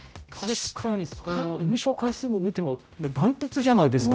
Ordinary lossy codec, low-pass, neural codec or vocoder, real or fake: none; none; codec, 16 kHz, 0.5 kbps, X-Codec, HuBERT features, trained on general audio; fake